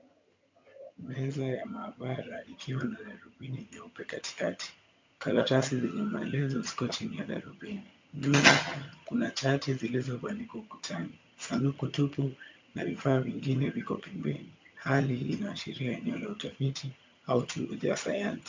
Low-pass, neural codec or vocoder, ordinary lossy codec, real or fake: 7.2 kHz; vocoder, 22.05 kHz, 80 mel bands, HiFi-GAN; MP3, 64 kbps; fake